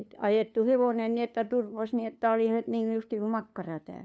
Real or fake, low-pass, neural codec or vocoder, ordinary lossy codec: fake; none; codec, 16 kHz, 2 kbps, FunCodec, trained on LibriTTS, 25 frames a second; none